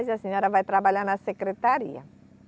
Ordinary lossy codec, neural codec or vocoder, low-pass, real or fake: none; none; none; real